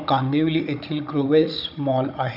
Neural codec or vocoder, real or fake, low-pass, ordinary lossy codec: codec, 16 kHz, 16 kbps, FunCodec, trained on Chinese and English, 50 frames a second; fake; 5.4 kHz; none